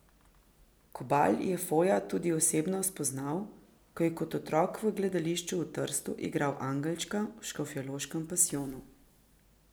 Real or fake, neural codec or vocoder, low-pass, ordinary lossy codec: real; none; none; none